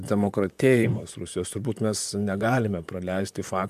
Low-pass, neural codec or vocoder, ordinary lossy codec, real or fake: 14.4 kHz; vocoder, 44.1 kHz, 128 mel bands, Pupu-Vocoder; MP3, 96 kbps; fake